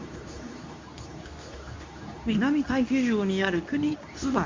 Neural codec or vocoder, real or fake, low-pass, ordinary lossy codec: codec, 24 kHz, 0.9 kbps, WavTokenizer, medium speech release version 2; fake; 7.2 kHz; MP3, 64 kbps